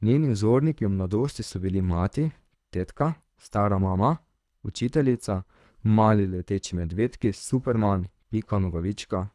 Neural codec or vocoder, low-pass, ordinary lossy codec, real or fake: codec, 24 kHz, 3 kbps, HILCodec; none; none; fake